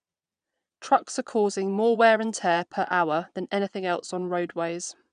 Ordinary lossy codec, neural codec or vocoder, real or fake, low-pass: none; vocoder, 22.05 kHz, 80 mel bands, Vocos; fake; 9.9 kHz